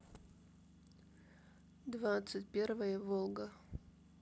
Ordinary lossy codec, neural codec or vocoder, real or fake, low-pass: none; none; real; none